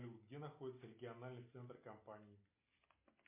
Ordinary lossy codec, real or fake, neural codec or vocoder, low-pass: MP3, 24 kbps; real; none; 3.6 kHz